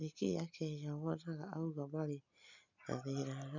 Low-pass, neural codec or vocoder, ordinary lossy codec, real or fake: 7.2 kHz; none; none; real